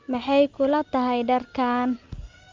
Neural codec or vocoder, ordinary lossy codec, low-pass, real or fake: none; Opus, 32 kbps; 7.2 kHz; real